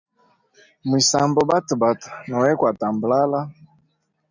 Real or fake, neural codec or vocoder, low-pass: real; none; 7.2 kHz